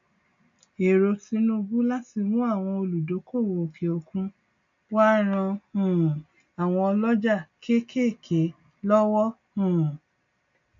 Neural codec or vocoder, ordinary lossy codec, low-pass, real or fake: none; MP3, 64 kbps; 7.2 kHz; real